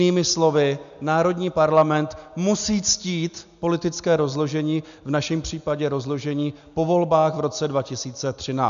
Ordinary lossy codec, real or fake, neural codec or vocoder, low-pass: AAC, 96 kbps; real; none; 7.2 kHz